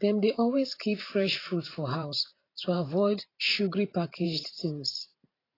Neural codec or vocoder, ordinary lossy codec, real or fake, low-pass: vocoder, 44.1 kHz, 128 mel bands every 512 samples, BigVGAN v2; AAC, 24 kbps; fake; 5.4 kHz